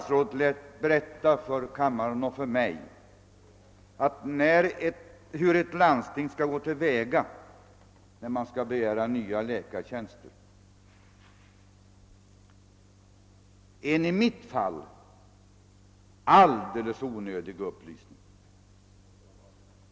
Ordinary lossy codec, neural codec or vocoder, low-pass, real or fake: none; none; none; real